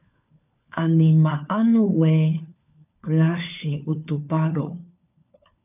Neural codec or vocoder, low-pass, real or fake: codec, 16 kHz, 4 kbps, FunCodec, trained on LibriTTS, 50 frames a second; 3.6 kHz; fake